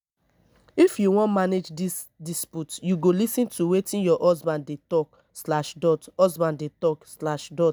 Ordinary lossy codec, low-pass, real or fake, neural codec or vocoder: none; none; real; none